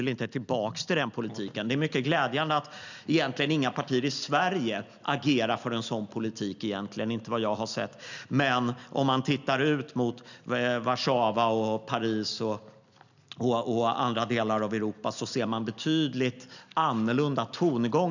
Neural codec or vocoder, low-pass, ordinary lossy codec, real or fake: none; 7.2 kHz; none; real